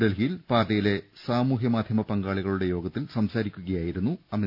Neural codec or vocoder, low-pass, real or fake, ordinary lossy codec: none; 5.4 kHz; real; none